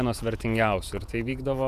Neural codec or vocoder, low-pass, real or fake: vocoder, 48 kHz, 128 mel bands, Vocos; 14.4 kHz; fake